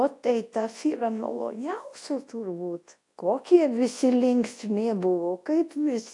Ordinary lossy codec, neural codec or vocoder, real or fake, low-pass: AAC, 48 kbps; codec, 24 kHz, 0.9 kbps, WavTokenizer, large speech release; fake; 10.8 kHz